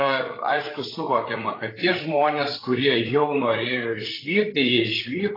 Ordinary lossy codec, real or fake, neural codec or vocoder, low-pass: AAC, 24 kbps; fake; codec, 16 kHz, 8 kbps, FreqCodec, larger model; 5.4 kHz